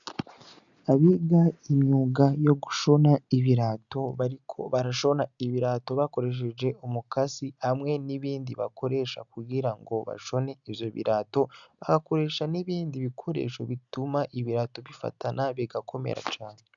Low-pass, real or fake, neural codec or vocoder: 7.2 kHz; real; none